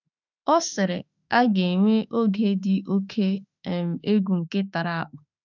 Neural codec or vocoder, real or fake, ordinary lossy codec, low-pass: autoencoder, 48 kHz, 32 numbers a frame, DAC-VAE, trained on Japanese speech; fake; none; 7.2 kHz